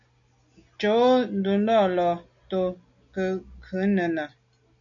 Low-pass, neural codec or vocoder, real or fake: 7.2 kHz; none; real